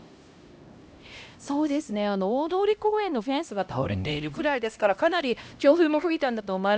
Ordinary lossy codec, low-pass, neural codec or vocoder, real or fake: none; none; codec, 16 kHz, 1 kbps, X-Codec, HuBERT features, trained on LibriSpeech; fake